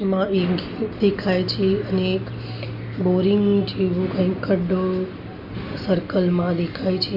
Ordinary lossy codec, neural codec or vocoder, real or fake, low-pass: none; none; real; 5.4 kHz